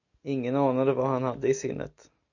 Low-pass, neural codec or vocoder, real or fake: 7.2 kHz; none; real